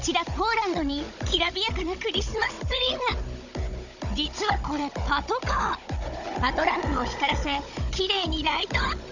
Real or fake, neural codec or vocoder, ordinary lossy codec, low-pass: fake; codec, 16 kHz, 16 kbps, FunCodec, trained on Chinese and English, 50 frames a second; none; 7.2 kHz